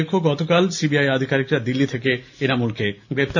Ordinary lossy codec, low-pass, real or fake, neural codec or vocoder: none; 7.2 kHz; real; none